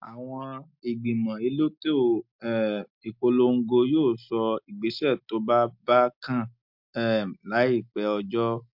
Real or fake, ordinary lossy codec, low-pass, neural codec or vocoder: real; MP3, 48 kbps; 5.4 kHz; none